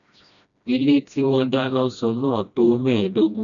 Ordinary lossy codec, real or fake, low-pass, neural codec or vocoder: none; fake; 7.2 kHz; codec, 16 kHz, 1 kbps, FreqCodec, smaller model